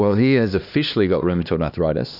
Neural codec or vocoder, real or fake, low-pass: codec, 16 kHz, 2 kbps, X-Codec, HuBERT features, trained on LibriSpeech; fake; 5.4 kHz